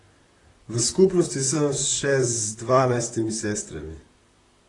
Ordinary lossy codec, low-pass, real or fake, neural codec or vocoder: AAC, 32 kbps; 10.8 kHz; fake; vocoder, 44.1 kHz, 128 mel bands, Pupu-Vocoder